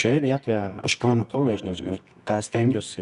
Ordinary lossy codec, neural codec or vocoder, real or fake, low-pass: Opus, 64 kbps; codec, 24 kHz, 0.9 kbps, WavTokenizer, medium music audio release; fake; 10.8 kHz